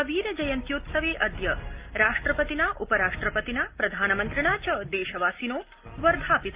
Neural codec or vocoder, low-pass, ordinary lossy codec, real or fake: none; 3.6 kHz; Opus, 32 kbps; real